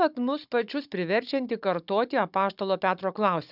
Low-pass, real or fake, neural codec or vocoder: 5.4 kHz; fake; codec, 16 kHz, 16 kbps, FunCodec, trained on Chinese and English, 50 frames a second